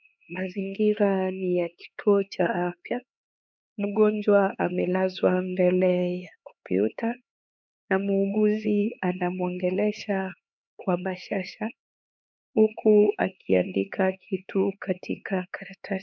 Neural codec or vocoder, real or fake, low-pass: codec, 16 kHz, 4 kbps, X-Codec, HuBERT features, trained on LibriSpeech; fake; 7.2 kHz